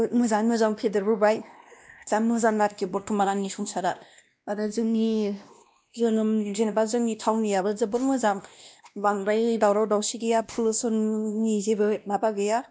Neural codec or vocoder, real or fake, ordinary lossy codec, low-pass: codec, 16 kHz, 1 kbps, X-Codec, WavLM features, trained on Multilingual LibriSpeech; fake; none; none